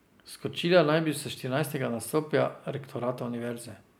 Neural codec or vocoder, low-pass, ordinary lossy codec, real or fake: none; none; none; real